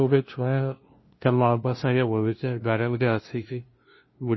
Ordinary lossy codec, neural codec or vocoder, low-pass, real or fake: MP3, 24 kbps; codec, 16 kHz, 0.5 kbps, FunCodec, trained on LibriTTS, 25 frames a second; 7.2 kHz; fake